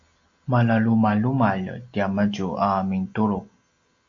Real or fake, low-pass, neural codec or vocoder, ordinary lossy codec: real; 7.2 kHz; none; AAC, 48 kbps